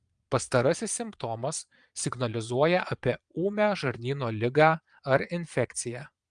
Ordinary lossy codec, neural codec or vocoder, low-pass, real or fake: Opus, 32 kbps; vocoder, 22.05 kHz, 80 mel bands, Vocos; 9.9 kHz; fake